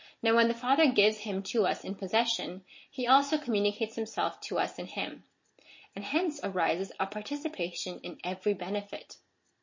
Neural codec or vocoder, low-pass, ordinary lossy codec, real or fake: none; 7.2 kHz; MP3, 32 kbps; real